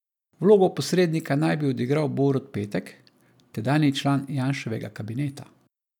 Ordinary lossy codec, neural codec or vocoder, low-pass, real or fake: none; none; 19.8 kHz; real